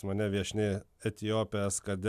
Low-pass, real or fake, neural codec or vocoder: 14.4 kHz; real; none